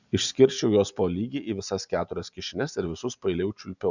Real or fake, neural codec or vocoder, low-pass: real; none; 7.2 kHz